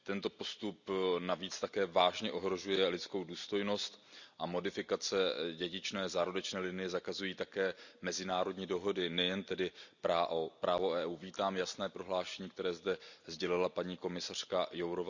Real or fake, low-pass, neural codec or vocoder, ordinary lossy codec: real; 7.2 kHz; none; none